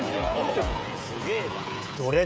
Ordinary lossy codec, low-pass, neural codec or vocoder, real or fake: none; none; codec, 16 kHz, 16 kbps, FreqCodec, smaller model; fake